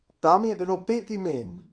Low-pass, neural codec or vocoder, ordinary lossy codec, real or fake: 9.9 kHz; codec, 24 kHz, 0.9 kbps, WavTokenizer, small release; AAC, 48 kbps; fake